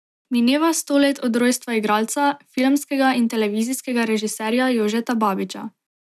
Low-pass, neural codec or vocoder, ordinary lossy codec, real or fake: 14.4 kHz; none; none; real